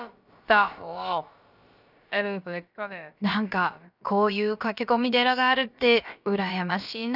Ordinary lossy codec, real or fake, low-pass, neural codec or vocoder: none; fake; 5.4 kHz; codec, 16 kHz, about 1 kbps, DyCAST, with the encoder's durations